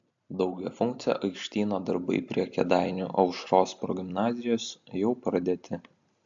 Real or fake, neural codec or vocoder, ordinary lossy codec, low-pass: real; none; AAC, 64 kbps; 7.2 kHz